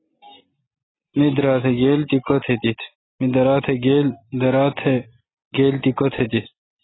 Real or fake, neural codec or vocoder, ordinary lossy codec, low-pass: real; none; AAC, 16 kbps; 7.2 kHz